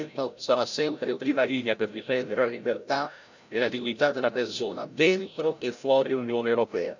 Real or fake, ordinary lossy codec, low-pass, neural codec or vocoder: fake; none; 7.2 kHz; codec, 16 kHz, 0.5 kbps, FreqCodec, larger model